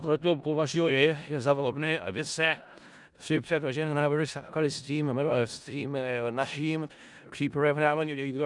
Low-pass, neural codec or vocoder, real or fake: 10.8 kHz; codec, 16 kHz in and 24 kHz out, 0.4 kbps, LongCat-Audio-Codec, four codebook decoder; fake